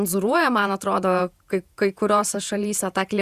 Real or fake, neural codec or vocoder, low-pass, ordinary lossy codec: fake; vocoder, 48 kHz, 128 mel bands, Vocos; 14.4 kHz; Opus, 64 kbps